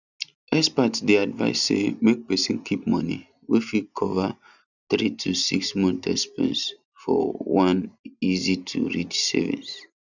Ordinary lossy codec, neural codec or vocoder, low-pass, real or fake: none; none; 7.2 kHz; real